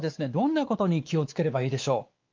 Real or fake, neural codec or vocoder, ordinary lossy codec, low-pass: fake; codec, 16 kHz, 4 kbps, X-Codec, WavLM features, trained on Multilingual LibriSpeech; Opus, 32 kbps; 7.2 kHz